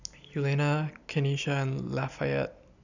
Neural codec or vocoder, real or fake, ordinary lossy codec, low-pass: none; real; none; 7.2 kHz